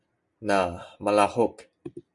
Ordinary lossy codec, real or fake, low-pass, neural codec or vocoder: Opus, 64 kbps; real; 10.8 kHz; none